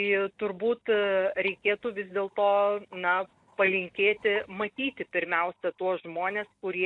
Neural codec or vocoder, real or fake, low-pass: none; real; 10.8 kHz